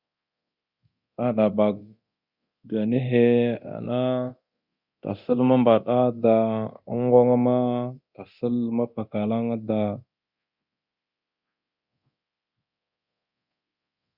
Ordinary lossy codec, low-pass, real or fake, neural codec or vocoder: Opus, 64 kbps; 5.4 kHz; fake; codec, 24 kHz, 0.9 kbps, DualCodec